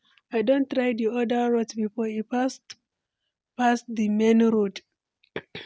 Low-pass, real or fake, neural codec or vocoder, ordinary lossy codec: none; real; none; none